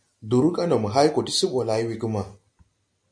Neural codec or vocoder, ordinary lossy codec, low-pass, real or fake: none; AAC, 64 kbps; 9.9 kHz; real